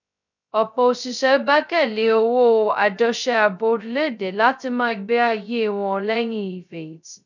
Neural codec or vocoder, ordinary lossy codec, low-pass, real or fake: codec, 16 kHz, 0.2 kbps, FocalCodec; none; 7.2 kHz; fake